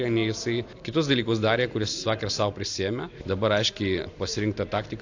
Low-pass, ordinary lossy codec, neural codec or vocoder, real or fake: 7.2 kHz; AAC, 48 kbps; none; real